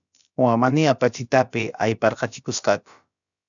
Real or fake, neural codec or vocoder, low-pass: fake; codec, 16 kHz, about 1 kbps, DyCAST, with the encoder's durations; 7.2 kHz